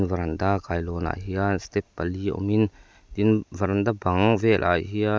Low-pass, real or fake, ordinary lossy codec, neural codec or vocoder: none; real; none; none